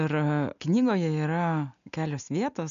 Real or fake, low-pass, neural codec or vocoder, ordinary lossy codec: real; 7.2 kHz; none; MP3, 96 kbps